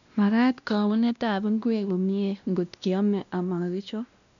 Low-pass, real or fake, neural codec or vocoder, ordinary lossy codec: 7.2 kHz; fake; codec, 16 kHz, 1 kbps, X-Codec, WavLM features, trained on Multilingual LibriSpeech; none